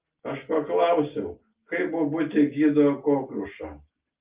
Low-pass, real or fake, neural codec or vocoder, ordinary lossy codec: 3.6 kHz; real; none; Opus, 24 kbps